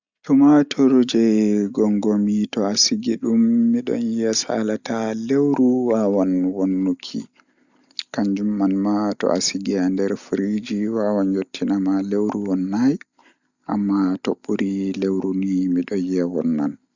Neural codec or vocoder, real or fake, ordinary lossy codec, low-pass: none; real; Opus, 64 kbps; 7.2 kHz